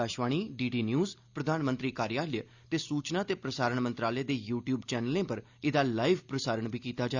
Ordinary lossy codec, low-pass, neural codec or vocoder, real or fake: Opus, 64 kbps; 7.2 kHz; none; real